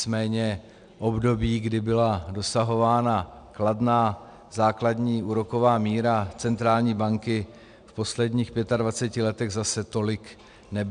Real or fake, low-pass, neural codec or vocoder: real; 9.9 kHz; none